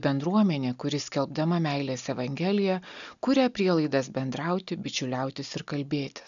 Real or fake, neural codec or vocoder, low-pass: real; none; 7.2 kHz